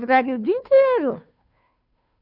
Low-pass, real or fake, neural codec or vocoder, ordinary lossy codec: 5.4 kHz; fake; codec, 16 kHz, 2 kbps, FunCodec, trained on Chinese and English, 25 frames a second; none